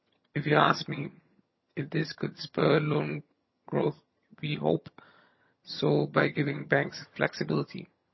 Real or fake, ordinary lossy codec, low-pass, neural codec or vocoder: fake; MP3, 24 kbps; 7.2 kHz; vocoder, 22.05 kHz, 80 mel bands, HiFi-GAN